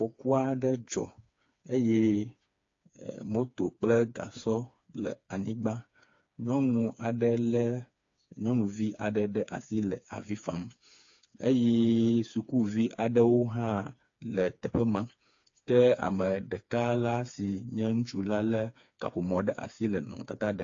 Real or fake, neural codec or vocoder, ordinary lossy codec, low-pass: fake; codec, 16 kHz, 4 kbps, FreqCodec, smaller model; AAC, 48 kbps; 7.2 kHz